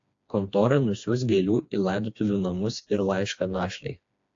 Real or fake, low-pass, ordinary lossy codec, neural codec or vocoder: fake; 7.2 kHz; AAC, 48 kbps; codec, 16 kHz, 2 kbps, FreqCodec, smaller model